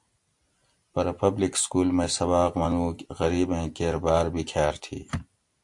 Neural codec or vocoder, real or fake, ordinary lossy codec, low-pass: none; real; AAC, 64 kbps; 10.8 kHz